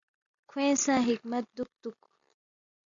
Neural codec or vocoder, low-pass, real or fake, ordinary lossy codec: none; 7.2 kHz; real; MP3, 64 kbps